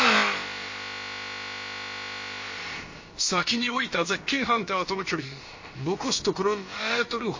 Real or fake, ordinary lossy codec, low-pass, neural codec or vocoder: fake; MP3, 32 kbps; 7.2 kHz; codec, 16 kHz, about 1 kbps, DyCAST, with the encoder's durations